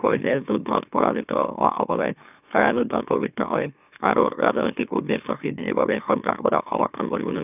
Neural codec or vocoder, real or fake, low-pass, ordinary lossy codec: autoencoder, 44.1 kHz, a latent of 192 numbers a frame, MeloTTS; fake; 3.6 kHz; none